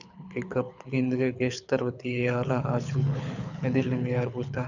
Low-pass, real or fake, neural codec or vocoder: 7.2 kHz; fake; codec, 24 kHz, 6 kbps, HILCodec